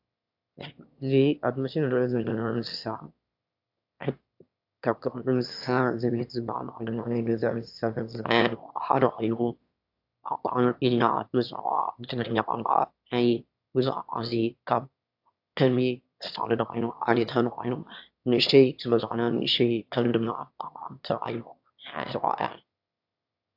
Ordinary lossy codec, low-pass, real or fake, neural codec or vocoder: AAC, 48 kbps; 5.4 kHz; fake; autoencoder, 22.05 kHz, a latent of 192 numbers a frame, VITS, trained on one speaker